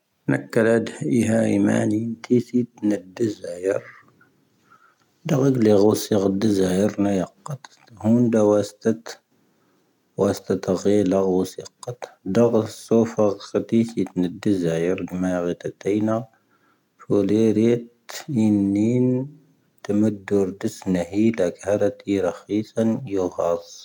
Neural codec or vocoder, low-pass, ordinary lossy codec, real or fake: none; 19.8 kHz; none; real